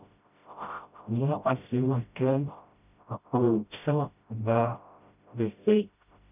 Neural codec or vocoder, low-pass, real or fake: codec, 16 kHz, 0.5 kbps, FreqCodec, smaller model; 3.6 kHz; fake